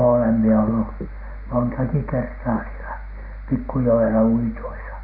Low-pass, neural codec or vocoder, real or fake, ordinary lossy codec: 5.4 kHz; none; real; none